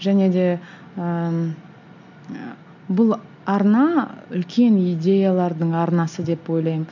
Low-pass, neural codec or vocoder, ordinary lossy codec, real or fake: 7.2 kHz; none; none; real